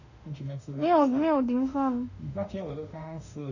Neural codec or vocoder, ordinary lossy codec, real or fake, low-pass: autoencoder, 48 kHz, 32 numbers a frame, DAC-VAE, trained on Japanese speech; none; fake; 7.2 kHz